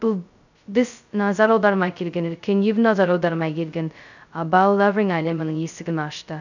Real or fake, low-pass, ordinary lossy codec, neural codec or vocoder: fake; 7.2 kHz; none; codec, 16 kHz, 0.2 kbps, FocalCodec